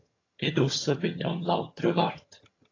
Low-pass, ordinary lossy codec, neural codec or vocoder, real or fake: 7.2 kHz; AAC, 32 kbps; vocoder, 22.05 kHz, 80 mel bands, HiFi-GAN; fake